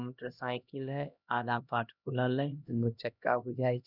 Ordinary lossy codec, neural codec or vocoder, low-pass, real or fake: none; codec, 16 kHz, 1 kbps, X-Codec, HuBERT features, trained on LibriSpeech; 5.4 kHz; fake